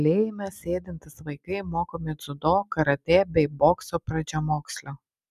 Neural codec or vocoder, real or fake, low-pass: none; real; 14.4 kHz